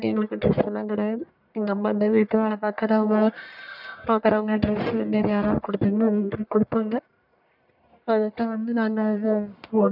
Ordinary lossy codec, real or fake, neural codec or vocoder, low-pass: none; fake; codec, 44.1 kHz, 1.7 kbps, Pupu-Codec; 5.4 kHz